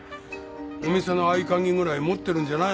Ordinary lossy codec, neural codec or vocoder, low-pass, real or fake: none; none; none; real